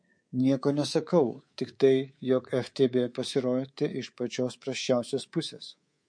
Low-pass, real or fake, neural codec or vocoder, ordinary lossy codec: 9.9 kHz; fake; codec, 24 kHz, 3.1 kbps, DualCodec; MP3, 48 kbps